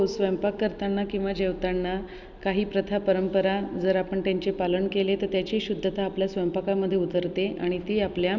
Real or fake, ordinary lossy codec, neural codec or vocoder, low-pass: real; none; none; 7.2 kHz